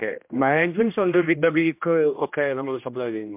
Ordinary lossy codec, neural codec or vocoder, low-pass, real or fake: MP3, 32 kbps; codec, 16 kHz, 1 kbps, X-Codec, HuBERT features, trained on general audio; 3.6 kHz; fake